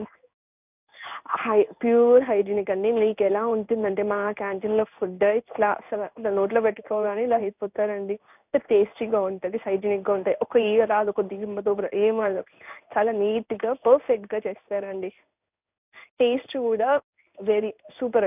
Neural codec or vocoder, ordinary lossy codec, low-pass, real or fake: codec, 16 kHz in and 24 kHz out, 1 kbps, XY-Tokenizer; none; 3.6 kHz; fake